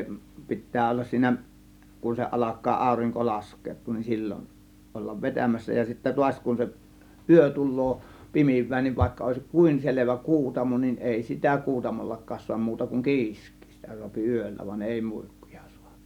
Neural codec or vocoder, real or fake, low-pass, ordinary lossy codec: none; real; 19.8 kHz; none